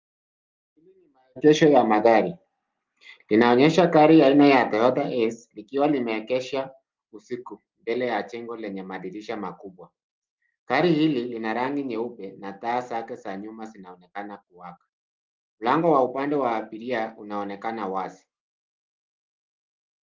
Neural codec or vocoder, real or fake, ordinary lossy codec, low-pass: none; real; Opus, 32 kbps; 7.2 kHz